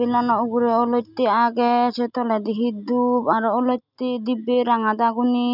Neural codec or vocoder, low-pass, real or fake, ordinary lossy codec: none; 5.4 kHz; real; none